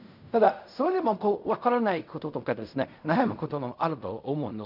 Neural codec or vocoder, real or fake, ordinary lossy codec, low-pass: codec, 16 kHz in and 24 kHz out, 0.4 kbps, LongCat-Audio-Codec, fine tuned four codebook decoder; fake; none; 5.4 kHz